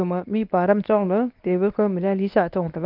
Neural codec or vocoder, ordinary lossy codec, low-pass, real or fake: codec, 24 kHz, 0.9 kbps, WavTokenizer, medium speech release version 1; Opus, 24 kbps; 5.4 kHz; fake